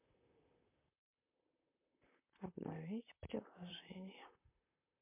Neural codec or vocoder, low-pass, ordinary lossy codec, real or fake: vocoder, 44.1 kHz, 128 mel bands, Pupu-Vocoder; 3.6 kHz; MP3, 32 kbps; fake